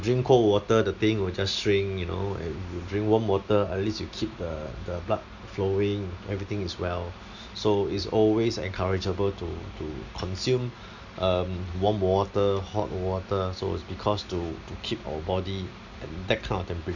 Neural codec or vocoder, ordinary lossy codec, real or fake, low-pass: none; none; real; 7.2 kHz